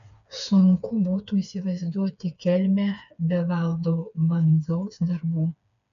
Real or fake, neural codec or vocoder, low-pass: fake; codec, 16 kHz, 4 kbps, FreqCodec, smaller model; 7.2 kHz